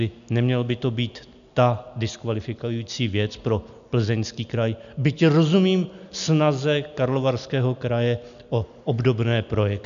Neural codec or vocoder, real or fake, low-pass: none; real; 7.2 kHz